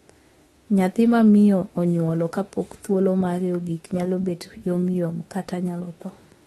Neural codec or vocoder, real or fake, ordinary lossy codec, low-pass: autoencoder, 48 kHz, 32 numbers a frame, DAC-VAE, trained on Japanese speech; fake; AAC, 32 kbps; 19.8 kHz